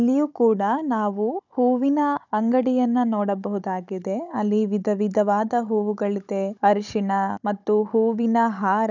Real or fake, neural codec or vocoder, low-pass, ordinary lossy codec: fake; codec, 16 kHz, 16 kbps, FunCodec, trained on Chinese and English, 50 frames a second; 7.2 kHz; none